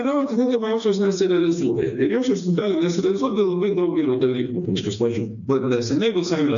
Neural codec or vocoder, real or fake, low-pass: codec, 16 kHz, 2 kbps, FreqCodec, smaller model; fake; 7.2 kHz